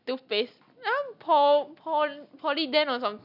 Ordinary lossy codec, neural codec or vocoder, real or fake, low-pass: none; none; real; 5.4 kHz